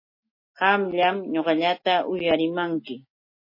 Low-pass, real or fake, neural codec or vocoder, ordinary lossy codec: 5.4 kHz; real; none; MP3, 24 kbps